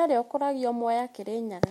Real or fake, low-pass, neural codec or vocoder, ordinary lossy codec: real; 19.8 kHz; none; MP3, 64 kbps